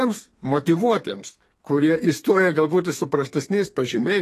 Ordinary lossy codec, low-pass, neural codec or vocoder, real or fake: AAC, 48 kbps; 14.4 kHz; codec, 32 kHz, 1.9 kbps, SNAC; fake